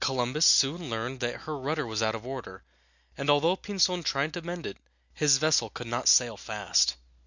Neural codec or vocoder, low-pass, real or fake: none; 7.2 kHz; real